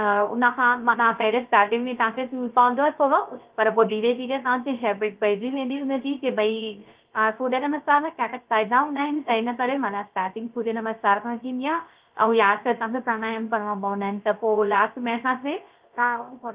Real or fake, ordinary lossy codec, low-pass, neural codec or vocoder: fake; Opus, 32 kbps; 3.6 kHz; codec, 16 kHz, 0.3 kbps, FocalCodec